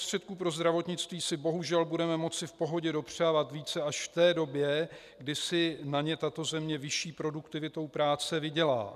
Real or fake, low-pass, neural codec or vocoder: real; 14.4 kHz; none